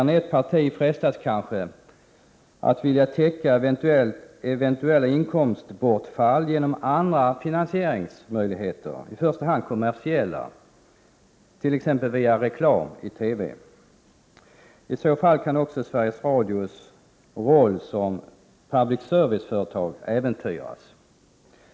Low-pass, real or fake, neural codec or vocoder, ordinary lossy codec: none; real; none; none